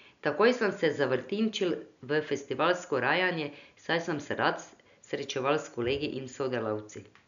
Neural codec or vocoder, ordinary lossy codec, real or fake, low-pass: none; none; real; 7.2 kHz